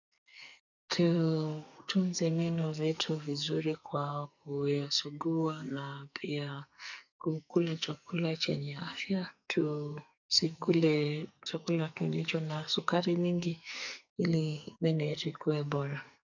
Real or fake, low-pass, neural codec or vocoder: fake; 7.2 kHz; codec, 44.1 kHz, 2.6 kbps, SNAC